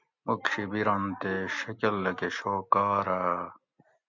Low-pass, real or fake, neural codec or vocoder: 7.2 kHz; real; none